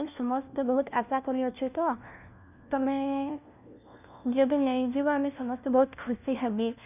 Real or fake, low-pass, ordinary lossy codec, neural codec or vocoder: fake; 3.6 kHz; none; codec, 16 kHz, 1 kbps, FunCodec, trained on LibriTTS, 50 frames a second